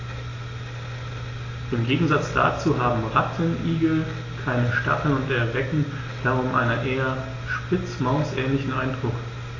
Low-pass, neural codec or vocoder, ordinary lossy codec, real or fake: 7.2 kHz; none; AAC, 32 kbps; real